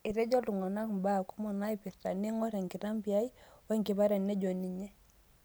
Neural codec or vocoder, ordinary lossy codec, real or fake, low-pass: none; none; real; none